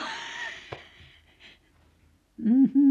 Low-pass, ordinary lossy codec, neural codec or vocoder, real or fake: 14.4 kHz; none; none; real